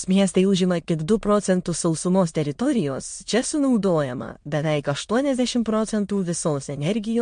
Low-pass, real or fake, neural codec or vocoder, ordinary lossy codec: 9.9 kHz; fake; autoencoder, 22.05 kHz, a latent of 192 numbers a frame, VITS, trained on many speakers; MP3, 48 kbps